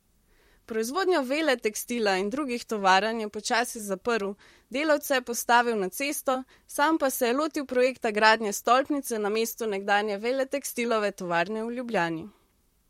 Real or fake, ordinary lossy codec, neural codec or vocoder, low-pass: fake; MP3, 64 kbps; vocoder, 44.1 kHz, 128 mel bands, Pupu-Vocoder; 19.8 kHz